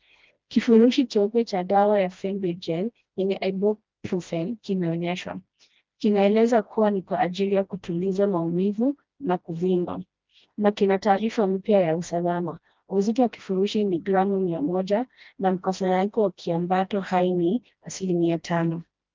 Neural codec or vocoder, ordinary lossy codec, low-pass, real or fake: codec, 16 kHz, 1 kbps, FreqCodec, smaller model; Opus, 24 kbps; 7.2 kHz; fake